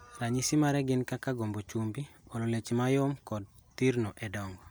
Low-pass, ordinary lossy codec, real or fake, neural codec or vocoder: none; none; real; none